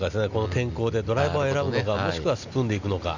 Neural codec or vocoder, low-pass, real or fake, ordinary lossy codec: none; 7.2 kHz; real; none